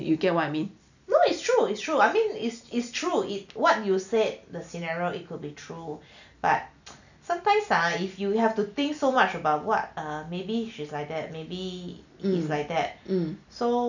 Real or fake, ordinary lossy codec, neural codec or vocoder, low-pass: real; none; none; 7.2 kHz